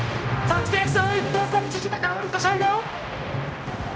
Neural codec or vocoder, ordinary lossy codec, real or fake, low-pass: codec, 16 kHz, 0.5 kbps, X-Codec, HuBERT features, trained on general audio; none; fake; none